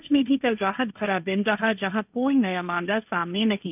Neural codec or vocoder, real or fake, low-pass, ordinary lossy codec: codec, 16 kHz, 1.1 kbps, Voila-Tokenizer; fake; 3.6 kHz; none